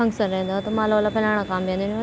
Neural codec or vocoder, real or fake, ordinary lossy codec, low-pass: none; real; none; none